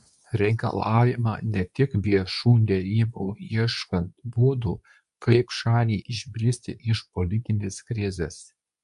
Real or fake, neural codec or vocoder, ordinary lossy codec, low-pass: fake; codec, 24 kHz, 0.9 kbps, WavTokenizer, medium speech release version 2; MP3, 96 kbps; 10.8 kHz